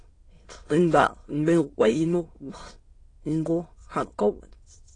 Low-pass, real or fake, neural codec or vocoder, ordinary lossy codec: 9.9 kHz; fake; autoencoder, 22.05 kHz, a latent of 192 numbers a frame, VITS, trained on many speakers; AAC, 32 kbps